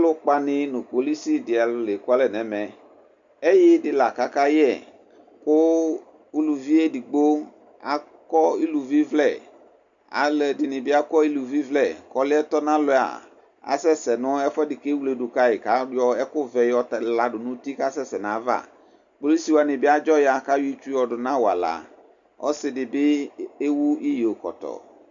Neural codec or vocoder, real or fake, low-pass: none; real; 7.2 kHz